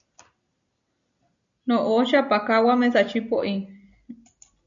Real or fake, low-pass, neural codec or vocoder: real; 7.2 kHz; none